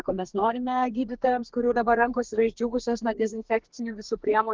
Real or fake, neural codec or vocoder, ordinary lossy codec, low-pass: fake; codec, 32 kHz, 1.9 kbps, SNAC; Opus, 16 kbps; 7.2 kHz